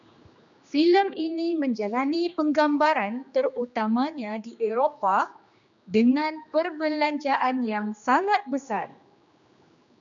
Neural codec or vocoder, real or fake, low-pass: codec, 16 kHz, 2 kbps, X-Codec, HuBERT features, trained on general audio; fake; 7.2 kHz